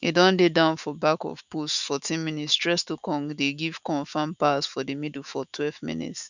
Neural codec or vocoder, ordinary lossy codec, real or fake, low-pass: autoencoder, 48 kHz, 128 numbers a frame, DAC-VAE, trained on Japanese speech; none; fake; 7.2 kHz